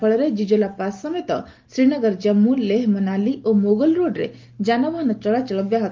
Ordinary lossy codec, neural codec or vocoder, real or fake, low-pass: Opus, 24 kbps; none; real; 7.2 kHz